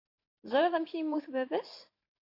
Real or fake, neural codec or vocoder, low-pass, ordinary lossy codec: fake; vocoder, 44.1 kHz, 128 mel bands every 512 samples, BigVGAN v2; 5.4 kHz; AAC, 32 kbps